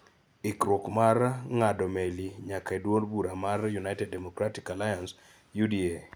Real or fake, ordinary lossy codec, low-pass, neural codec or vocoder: real; none; none; none